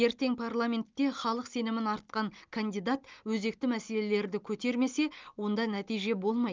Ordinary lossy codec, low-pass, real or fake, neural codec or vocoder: Opus, 24 kbps; 7.2 kHz; real; none